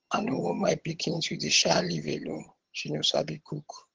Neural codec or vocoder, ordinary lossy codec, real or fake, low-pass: vocoder, 22.05 kHz, 80 mel bands, HiFi-GAN; Opus, 16 kbps; fake; 7.2 kHz